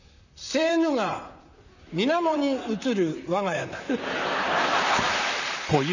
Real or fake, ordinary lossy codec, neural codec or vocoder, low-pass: fake; none; vocoder, 44.1 kHz, 128 mel bands, Pupu-Vocoder; 7.2 kHz